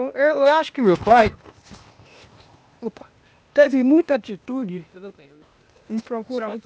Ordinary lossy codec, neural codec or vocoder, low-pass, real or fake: none; codec, 16 kHz, 0.8 kbps, ZipCodec; none; fake